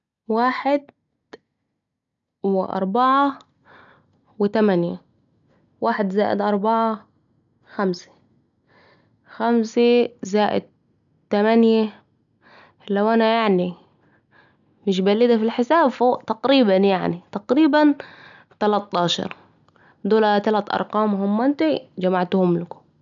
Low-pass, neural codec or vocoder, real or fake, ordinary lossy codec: 7.2 kHz; none; real; none